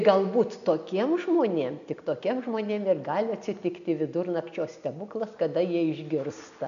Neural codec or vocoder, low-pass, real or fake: none; 7.2 kHz; real